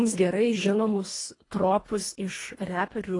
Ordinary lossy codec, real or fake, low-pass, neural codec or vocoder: AAC, 32 kbps; fake; 10.8 kHz; codec, 24 kHz, 1.5 kbps, HILCodec